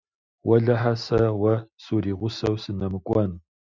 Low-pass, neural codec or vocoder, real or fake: 7.2 kHz; none; real